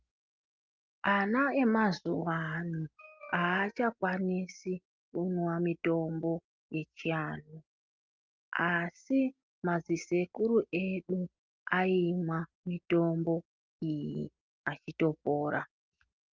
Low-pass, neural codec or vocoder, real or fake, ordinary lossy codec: 7.2 kHz; none; real; Opus, 24 kbps